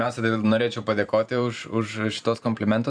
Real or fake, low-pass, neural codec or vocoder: fake; 9.9 kHz; vocoder, 44.1 kHz, 128 mel bands every 512 samples, BigVGAN v2